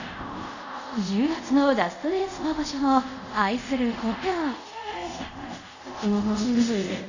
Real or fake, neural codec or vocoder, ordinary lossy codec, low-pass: fake; codec, 24 kHz, 0.5 kbps, DualCodec; none; 7.2 kHz